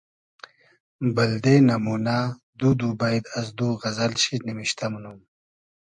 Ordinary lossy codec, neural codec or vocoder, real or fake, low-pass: MP3, 64 kbps; none; real; 10.8 kHz